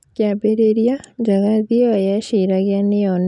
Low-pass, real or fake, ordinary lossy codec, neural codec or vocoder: 10.8 kHz; real; none; none